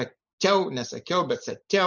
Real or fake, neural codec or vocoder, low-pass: real; none; 7.2 kHz